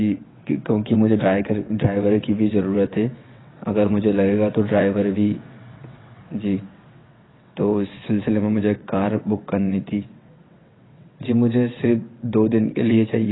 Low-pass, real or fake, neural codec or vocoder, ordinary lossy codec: 7.2 kHz; fake; vocoder, 22.05 kHz, 80 mel bands, WaveNeXt; AAC, 16 kbps